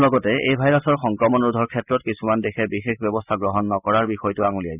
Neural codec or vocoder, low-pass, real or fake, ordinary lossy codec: none; 3.6 kHz; real; none